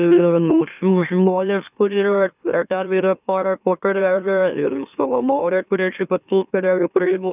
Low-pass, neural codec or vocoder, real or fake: 3.6 kHz; autoencoder, 44.1 kHz, a latent of 192 numbers a frame, MeloTTS; fake